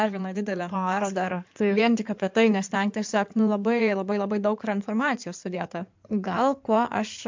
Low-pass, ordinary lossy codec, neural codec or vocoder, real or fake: 7.2 kHz; MP3, 64 kbps; codec, 16 kHz in and 24 kHz out, 2.2 kbps, FireRedTTS-2 codec; fake